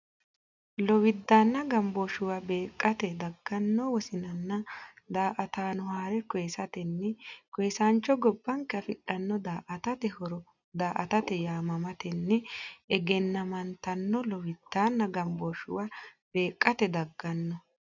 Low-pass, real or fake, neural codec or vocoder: 7.2 kHz; real; none